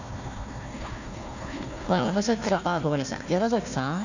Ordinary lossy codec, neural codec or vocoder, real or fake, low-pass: none; codec, 16 kHz, 1 kbps, FunCodec, trained on Chinese and English, 50 frames a second; fake; 7.2 kHz